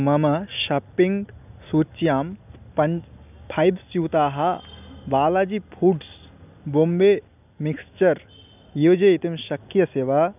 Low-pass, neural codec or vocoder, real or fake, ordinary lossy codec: 3.6 kHz; none; real; none